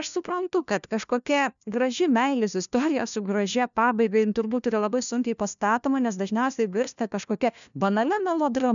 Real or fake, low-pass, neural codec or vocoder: fake; 7.2 kHz; codec, 16 kHz, 1 kbps, FunCodec, trained on LibriTTS, 50 frames a second